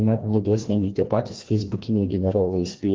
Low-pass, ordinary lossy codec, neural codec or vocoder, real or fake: 7.2 kHz; Opus, 16 kbps; codec, 16 kHz, 1 kbps, FreqCodec, larger model; fake